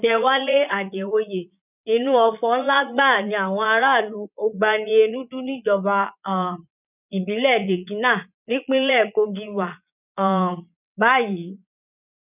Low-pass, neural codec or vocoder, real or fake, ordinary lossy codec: 3.6 kHz; vocoder, 22.05 kHz, 80 mel bands, Vocos; fake; none